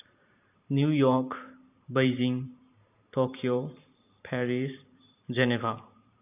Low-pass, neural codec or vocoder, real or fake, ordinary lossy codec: 3.6 kHz; vocoder, 44.1 kHz, 128 mel bands every 512 samples, BigVGAN v2; fake; none